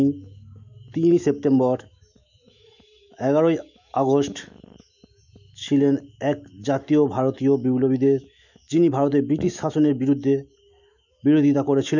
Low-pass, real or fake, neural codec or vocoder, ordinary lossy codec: 7.2 kHz; fake; autoencoder, 48 kHz, 128 numbers a frame, DAC-VAE, trained on Japanese speech; none